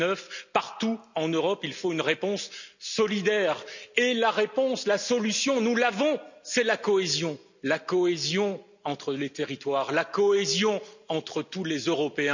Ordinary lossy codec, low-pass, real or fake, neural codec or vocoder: none; 7.2 kHz; real; none